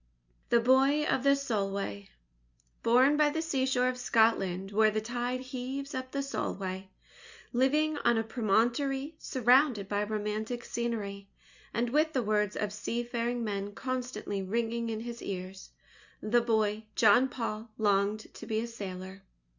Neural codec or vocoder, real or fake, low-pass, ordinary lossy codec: none; real; 7.2 kHz; Opus, 64 kbps